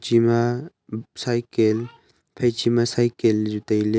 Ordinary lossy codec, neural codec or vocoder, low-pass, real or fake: none; none; none; real